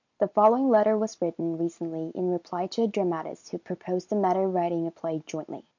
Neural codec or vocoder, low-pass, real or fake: none; 7.2 kHz; real